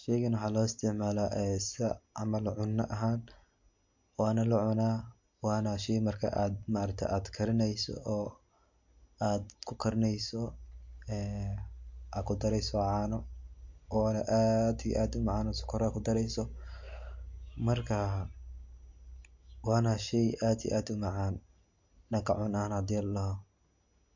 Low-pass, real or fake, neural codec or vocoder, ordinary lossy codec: 7.2 kHz; real; none; MP3, 48 kbps